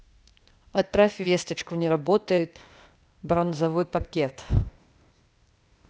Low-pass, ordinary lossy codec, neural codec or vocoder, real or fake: none; none; codec, 16 kHz, 0.8 kbps, ZipCodec; fake